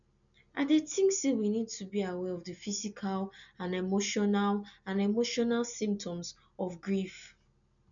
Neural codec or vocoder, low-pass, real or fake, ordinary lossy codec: none; 7.2 kHz; real; none